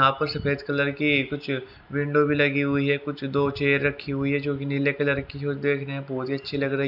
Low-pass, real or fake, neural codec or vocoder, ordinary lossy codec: 5.4 kHz; real; none; none